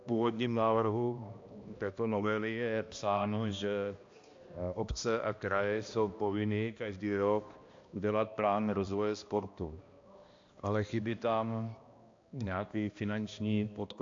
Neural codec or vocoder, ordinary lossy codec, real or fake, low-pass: codec, 16 kHz, 1 kbps, X-Codec, HuBERT features, trained on balanced general audio; AAC, 48 kbps; fake; 7.2 kHz